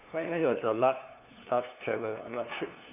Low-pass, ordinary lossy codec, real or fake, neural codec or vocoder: 3.6 kHz; none; fake; codec, 16 kHz, 2 kbps, FunCodec, trained on LibriTTS, 25 frames a second